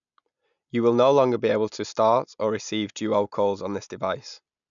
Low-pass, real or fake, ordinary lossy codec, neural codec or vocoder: 7.2 kHz; real; none; none